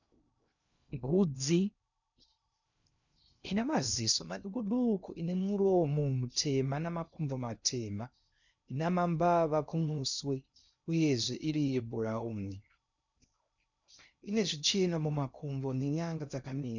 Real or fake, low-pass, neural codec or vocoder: fake; 7.2 kHz; codec, 16 kHz in and 24 kHz out, 0.6 kbps, FocalCodec, streaming, 2048 codes